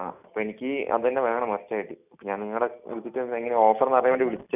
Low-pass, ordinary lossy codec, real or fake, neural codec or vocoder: 3.6 kHz; none; real; none